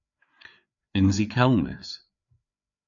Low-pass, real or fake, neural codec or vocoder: 7.2 kHz; fake; codec, 16 kHz, 4 kbps, FreqCodec, larger model